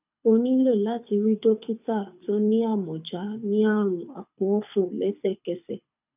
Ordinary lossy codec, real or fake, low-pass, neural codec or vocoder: none; fake; 3.6 kHz; codec, 24 kHz, 6 kbps, HILCodec